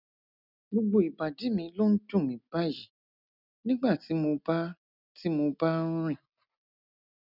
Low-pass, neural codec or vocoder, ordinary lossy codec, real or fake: 5.4 kHz; none; none; real